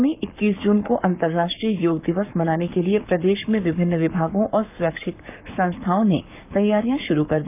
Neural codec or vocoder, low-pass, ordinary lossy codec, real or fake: codec, 44.1 kHz, 7.8 kbps, Pupu-Codec; 3.6 kHz; none; fake